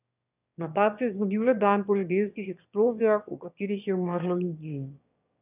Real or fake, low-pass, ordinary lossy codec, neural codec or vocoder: fake; 3.6 kHz; none; autoencoder, 22.05 kHz, a latent of 192 numbers a frame, VITS, trained on one speaker